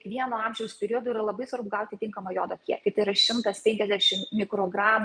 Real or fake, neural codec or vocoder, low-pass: fake; vocoder, 44.1 kHz, 128 mel bands every 256 samples, BigVGAN v2; 9.9 kHz